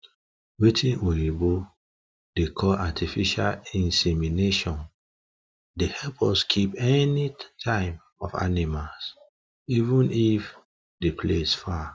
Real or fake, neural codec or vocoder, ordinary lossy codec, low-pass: real; none; none; none